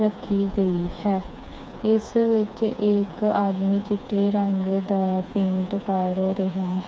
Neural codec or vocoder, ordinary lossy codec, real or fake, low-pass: codec, 16 kHz, 4 kbps, FreqCodec, smaller model; none; fake; none